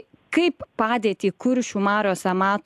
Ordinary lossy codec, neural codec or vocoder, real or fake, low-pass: AAC, 96 kbps; none; real; 14.4 kHz